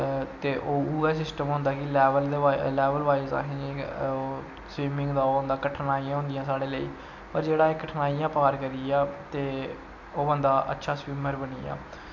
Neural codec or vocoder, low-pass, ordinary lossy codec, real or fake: none; 7.2 kHz; none; real